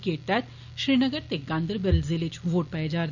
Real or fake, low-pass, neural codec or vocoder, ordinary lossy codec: real; 7.2 kHz; none; none